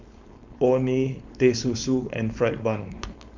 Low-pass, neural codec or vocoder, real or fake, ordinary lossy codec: 7.2 kHz; codec, 16 kHz, 4.8 kbps, FACodec; fake; MP3, 64 kbps